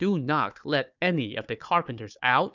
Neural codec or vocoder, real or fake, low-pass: codec, 16 kHz, 4 kbps, FunCodec, trained on Chinese and English, 50 frames a second; fake; 7.2 kHz